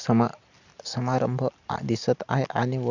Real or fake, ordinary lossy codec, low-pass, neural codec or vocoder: real; none; 7.2 kHz; none